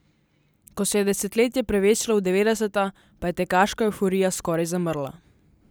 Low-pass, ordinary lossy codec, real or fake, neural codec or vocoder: none; none; real; none